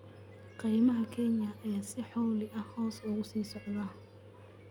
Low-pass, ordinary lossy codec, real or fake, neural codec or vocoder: 19.8 kHz; none; real; none